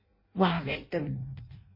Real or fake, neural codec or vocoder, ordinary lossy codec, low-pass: fake; codec, 16 kHz in and 24 kHz out, 0.6 kbps, FireRedTTS-2 codec; MP3, 24 kbps; 5.4 kHz